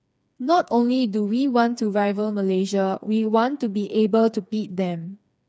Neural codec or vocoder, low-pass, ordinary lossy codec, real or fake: codec, 16 kHz, 4 kbps, FreqCodec, smaller model; none; none; fake